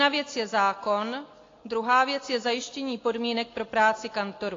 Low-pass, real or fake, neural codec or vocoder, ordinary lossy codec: 7.2 kHz; real; none; AAC, 32 kbps